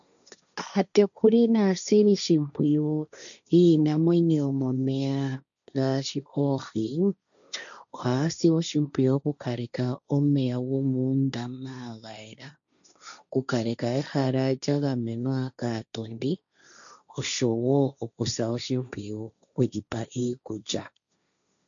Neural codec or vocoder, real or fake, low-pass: codec, 16 kHz, 1.1 kbps, Voila-Tokenizer; fake; 7.2 kHz